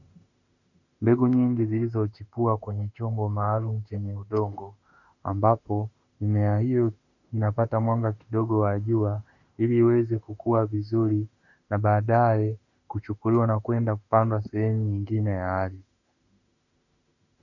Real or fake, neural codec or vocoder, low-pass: fake; autoencoder, 48 kHz, 32 numbers a frame, DAC-VAE, trained on Japanese speech; 7.2 kHz